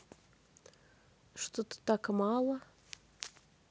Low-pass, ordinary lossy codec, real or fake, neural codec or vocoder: none; none; real; none